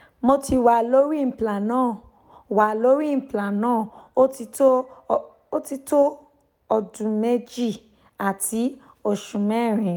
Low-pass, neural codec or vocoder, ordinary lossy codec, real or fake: 19.8 kHz; none; none; real